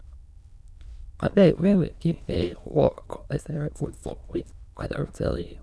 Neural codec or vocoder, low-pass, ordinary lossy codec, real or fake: autoencoder, 22.05 kHz, a latent of 192 numbers a frame, VITS, trained on many speakers; none; none; fake